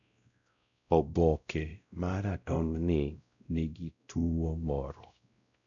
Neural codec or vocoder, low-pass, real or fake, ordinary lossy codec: codec, 16 kHz, 0.5 kbps, X-Codec, WavLM features, trained on Multilingual LibriSpeech; 7.2 kHz; fake; none